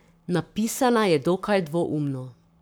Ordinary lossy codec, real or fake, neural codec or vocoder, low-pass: none; fake; codec, 44.1 kHz, 7.8 kbps, Pupu-Codec; none